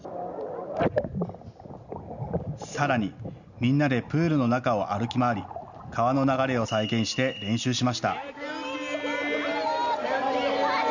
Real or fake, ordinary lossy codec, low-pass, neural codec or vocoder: real; none; 7.2 kHz; none